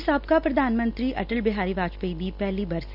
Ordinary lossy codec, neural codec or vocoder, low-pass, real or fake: none; none; 5.4 kHz; real